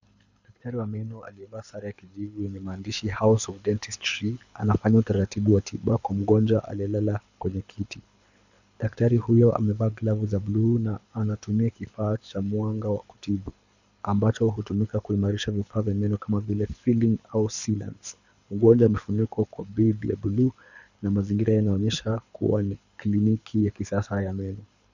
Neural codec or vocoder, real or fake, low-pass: codec, 24 kHz, 6 kbps, HILCodec; fake; 7.2 kHz